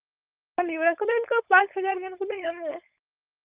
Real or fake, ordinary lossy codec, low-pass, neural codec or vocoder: fake; Opus, 24 kbps; 3.6 kHz; codec, 16 kHz, 4.8 kbps, FACodec